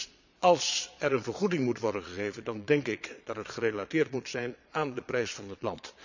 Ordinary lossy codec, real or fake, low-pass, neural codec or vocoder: none; fake; 7.2 kHz; vocoder, 22.05 kHz, 80 mel bands, Vocos